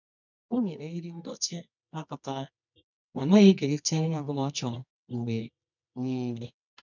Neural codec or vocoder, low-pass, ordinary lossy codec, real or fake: codec, 24 kHz, 0.9 kbps, WavTokenizer, medium music audio release; 7.2 kHz; none; fake